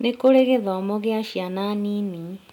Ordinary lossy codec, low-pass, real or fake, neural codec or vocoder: none; 19.8 kHz; real; none